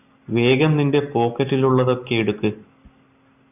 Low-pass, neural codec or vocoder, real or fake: 3.6 kHz; none; real